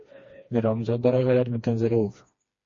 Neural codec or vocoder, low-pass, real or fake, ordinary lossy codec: codec, 16 kHz, 2 kbps, FreqCodec, smaller model; 7.2 kHz; fake; MP3, 32 kbps